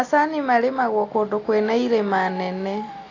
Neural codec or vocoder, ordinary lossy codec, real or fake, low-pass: none; AAC, 32 kbps; real; 7.2 kHz